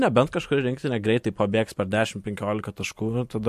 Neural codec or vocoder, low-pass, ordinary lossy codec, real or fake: vocoder, 44.1 kHz, 128 mel bands every 256 samples, BigVGAN v2; 14.4 kHz; MP3, 64 kbps; fake